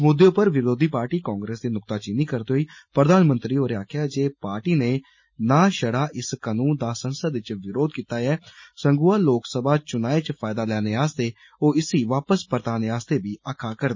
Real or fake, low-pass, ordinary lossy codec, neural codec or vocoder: real; 7.2 kHz; MP3, 32 kbps; none